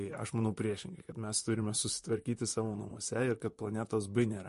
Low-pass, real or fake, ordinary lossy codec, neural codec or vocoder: 14.4 kHz; fake; MP3, 48 kbps; vocoder, 44.1 kHz, 128 mel bands, Pupu-Vocoder